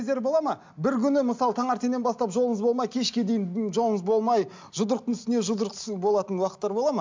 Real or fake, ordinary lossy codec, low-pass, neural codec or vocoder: real; MP3, 64 kbps; 7.2 kHz; none